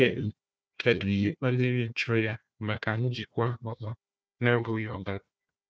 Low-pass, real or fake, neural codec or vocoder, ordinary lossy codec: none; fake; codec, 16 kHz, 1 kbps, FunCodec, trained on Chinese and English, 50 frames a second; none